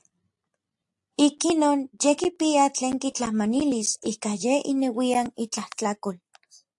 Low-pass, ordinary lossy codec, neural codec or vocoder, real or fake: 10.8 kHz; AAC, 64 kbps; none; real